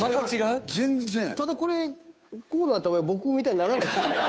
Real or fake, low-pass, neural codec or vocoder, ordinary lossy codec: fake; none; codec, 16 kHz, 2 kbps, FunCodec, trained on Chinese and English, 25 frames a second; none